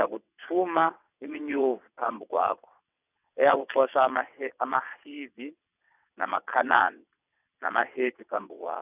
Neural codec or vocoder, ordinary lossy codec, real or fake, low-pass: vocoder, 22.05 kHz, 80 mel bands, WaveNeXt; none; fake; 3.6 kHz